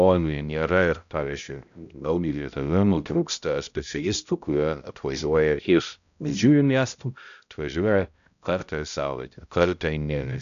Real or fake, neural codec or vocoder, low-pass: fake; codec, 16 kHz, 0.5 kbps, X-Codec, HuBERT features, trained on balanced general audio; 7.2 kHz